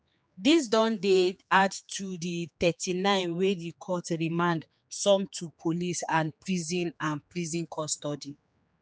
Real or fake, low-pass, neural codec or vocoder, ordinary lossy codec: fake; none; codec, 16 kHz, 4 kbps, X-Codec, HuBERT features, trained on general audio; none